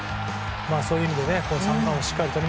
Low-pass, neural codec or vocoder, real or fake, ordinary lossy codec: none; none; real; none